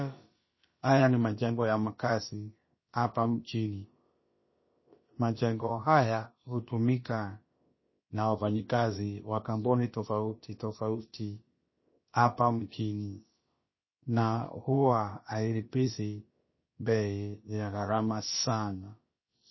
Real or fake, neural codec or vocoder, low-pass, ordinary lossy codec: fake; codec, 16 kHz, about 1 kbps, DyCAST, with the encoder's durations; 7.2 kHz; MP3, 24 kbps